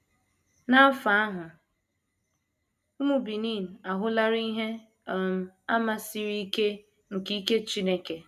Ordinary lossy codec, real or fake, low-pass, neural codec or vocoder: none; real; 14.4 kHz; none